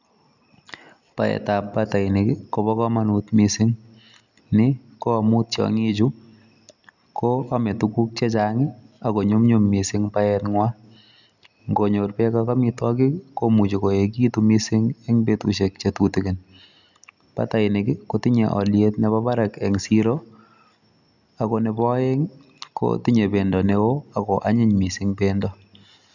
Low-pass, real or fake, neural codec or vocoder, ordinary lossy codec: 7.2 kHz; real; none; none